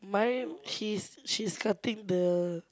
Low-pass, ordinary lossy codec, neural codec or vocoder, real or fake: none; none; none; real